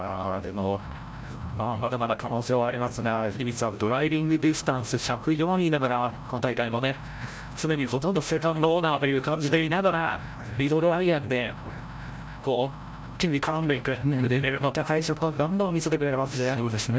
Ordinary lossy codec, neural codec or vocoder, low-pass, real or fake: none; codec, 16 kHz, 0.5 kbps, FreqCodec, larger model; none; fake